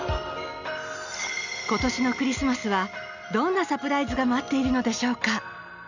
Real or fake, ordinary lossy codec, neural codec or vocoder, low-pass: real; none; none; 7.2 kHz